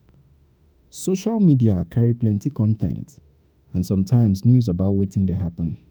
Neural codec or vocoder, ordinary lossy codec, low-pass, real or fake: autoencoder, 48 kHz, 32 numbers a frame, DAC-VAE, trained on Japanese speech; none; none; fake